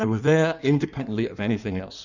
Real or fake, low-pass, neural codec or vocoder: fake; 7.2 kHz; codec, 16 kHz in and 24 kHz out, 1.1 kbps, FireRedTTS-2 codec